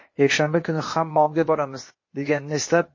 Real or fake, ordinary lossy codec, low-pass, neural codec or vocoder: fake; MP3, 32 kbps; 7.2 kHz; codec, 16 kHz, 0.8 kbps, ZipCodec